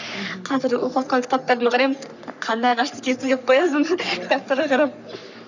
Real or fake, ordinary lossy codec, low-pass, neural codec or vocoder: fake; none; 7.2 kHz; codec, 44.1 kHz, 3.4 kbps, Pupu-Codec